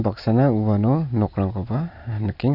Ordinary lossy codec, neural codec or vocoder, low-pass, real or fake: none; none; 5.4 kHz; real